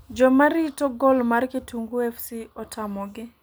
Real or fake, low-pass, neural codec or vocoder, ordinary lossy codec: real; none; none; none